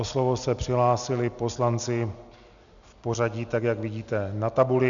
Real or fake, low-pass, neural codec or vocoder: real; 7.2 kHz; none